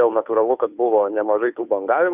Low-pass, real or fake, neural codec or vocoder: 3.6 kHz; fake; codec, 16 kHz, 2 kbps, FunCodec, trained on Chinese and English, 25 frames a second